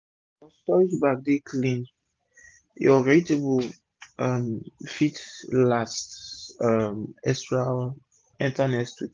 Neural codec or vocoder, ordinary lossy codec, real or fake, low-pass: none; Opus, 24 kbps; real; 7.2 kHz